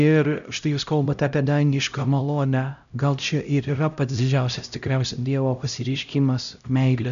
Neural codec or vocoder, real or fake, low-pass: codec, 16 kHz, 0.5 kbps, X-Codec, HuBERT features, trained on LibriSpeech; fake; 7.2 kHz